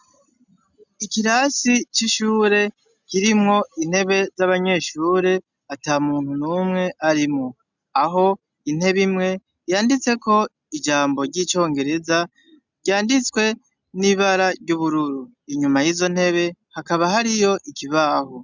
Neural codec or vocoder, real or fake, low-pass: none; real; 7.2 kHz